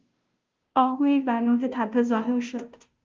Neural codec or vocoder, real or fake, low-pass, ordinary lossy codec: codec, 16 kHz, 0.5 kbps, FunCodec, trained on Chinese and English, 25 frames a second; fake; 7.2 kHz; Opus, 24 kbps